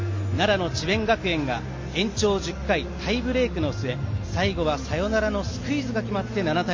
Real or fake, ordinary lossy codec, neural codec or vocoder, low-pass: real; MP3, 32 kbps; none; 7.2 kHz